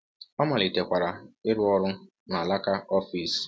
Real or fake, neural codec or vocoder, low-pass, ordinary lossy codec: real; none; none; none